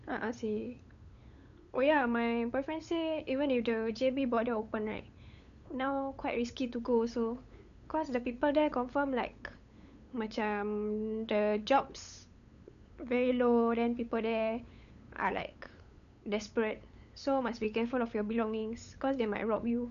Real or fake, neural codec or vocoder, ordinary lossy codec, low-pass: fake; codec, 16 kHz, 8 kbps, FunCodec, trained on LibriTTS, 25 frames a second; none; 7.2 kHz